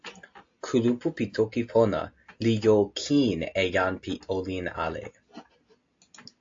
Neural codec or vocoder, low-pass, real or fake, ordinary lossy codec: none; 7.2 kHz; real; AAC, 48 kbps